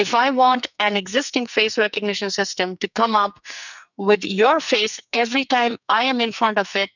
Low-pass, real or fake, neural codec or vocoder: 7.2 kHz; fake; codec, 44.1 kHz, 2.6 kbps, SNAC